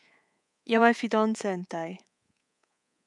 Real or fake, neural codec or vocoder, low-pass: fake; codec, 24 kHz, 3.1 kbps, DualCodec; 10.8 kHz